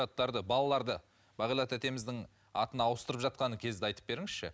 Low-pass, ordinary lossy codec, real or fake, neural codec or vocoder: none; none; real; none